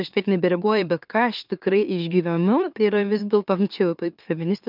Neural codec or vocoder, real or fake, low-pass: autoencoder, 44.1 kHz, a latent of 192 numbers a frame, MeloTTS; fake; 5.4 kHz